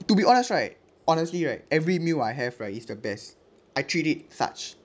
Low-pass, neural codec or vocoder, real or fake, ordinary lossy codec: none; none; real; none